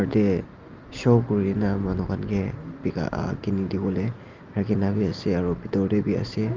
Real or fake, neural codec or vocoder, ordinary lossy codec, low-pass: real; none; Opus, 32 kbps; 7.2 kHz